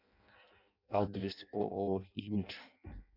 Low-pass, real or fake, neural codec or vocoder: 5.4 kHz; fake; codec, 16 kHz in and 24 kHz out, 0.6 kbps, FireRedTTS-2 codec